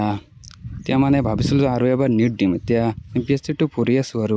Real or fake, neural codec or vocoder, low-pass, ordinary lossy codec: real; none; none; none